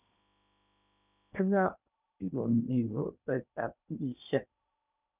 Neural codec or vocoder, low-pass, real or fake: codec, 16 kHz in and 24 kHz out, 0.8 kbps, FocalCodec, streaming, 65536 codes; 3.6 kHz; fake